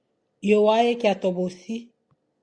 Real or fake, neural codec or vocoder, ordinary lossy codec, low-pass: real; none; Opus, 64 kbps; 9.9 kHz